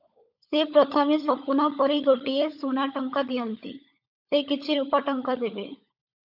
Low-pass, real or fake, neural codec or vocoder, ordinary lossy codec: 5.4 kHz; fake; codec, 16 kHz, 16 kbps, FunCodec, trained on LibriTTS, 50 frames a second; AAC, 48 kbps